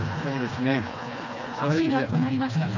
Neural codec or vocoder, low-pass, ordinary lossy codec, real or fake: codec, 16 kHz, 2 kbps, FreqCodec, smaller model; 7.2 kHz; none; fake